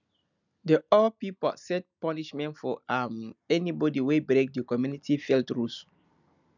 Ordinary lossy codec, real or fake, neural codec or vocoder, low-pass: none; real; none; 7.2 kHz